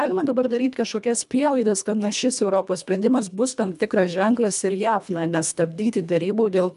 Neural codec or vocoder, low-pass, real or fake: codec, 24 kHz, 1.5 kbps, HILCodec; 10.8 kHz; fake